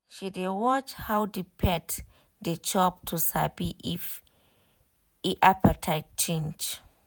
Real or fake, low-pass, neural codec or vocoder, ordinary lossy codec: fake; none; vocoder, 48 kHz, 128 mel bands, Vocos; none